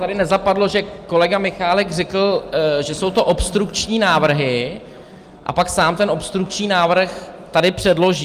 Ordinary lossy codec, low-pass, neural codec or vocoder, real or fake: Opus, 32 kbps; 14.4 kHz; none; real